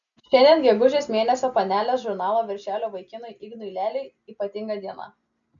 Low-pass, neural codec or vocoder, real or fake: 7.2 kHz; none; real